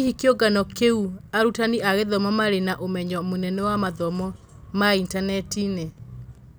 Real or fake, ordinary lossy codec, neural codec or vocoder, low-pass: real; none; none; none